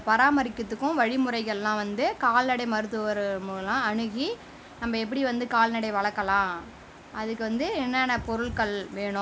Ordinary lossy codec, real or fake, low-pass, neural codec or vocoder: none; real; none; none